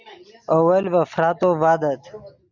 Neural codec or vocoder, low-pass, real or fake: none; 7.2 kHz; real